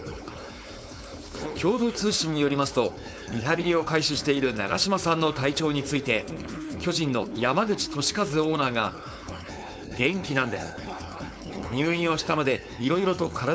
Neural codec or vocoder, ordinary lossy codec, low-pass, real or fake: codec, 16 kHz, 4.8 kbps, FACodec; none; none; fake